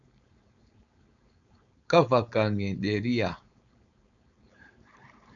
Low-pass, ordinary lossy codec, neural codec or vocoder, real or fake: 7.2 kHz; MP3, 96 kbps; codec, 16 kHz, 4.8 kbps, FACodec; fake